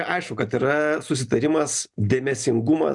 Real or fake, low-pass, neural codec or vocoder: real; 10.8 kHz; none